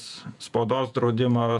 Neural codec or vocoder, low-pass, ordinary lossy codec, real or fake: vocoder, 48 kHz, 128 mel bands, Vocos; 10.8 kHz; MP3, 96 kbps; fake